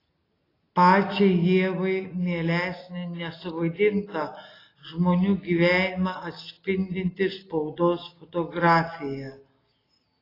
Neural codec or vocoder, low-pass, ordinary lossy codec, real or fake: none; 5.4 kHz; AAC, 24 kbps; real